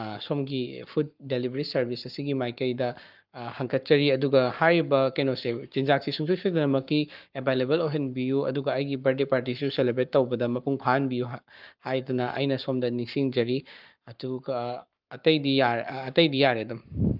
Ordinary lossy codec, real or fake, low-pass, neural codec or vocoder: Opus, 24 kbps; fake; 5.4 kHz; codec, 44.1 kHz, 7.8 kbps, Pupu-Codec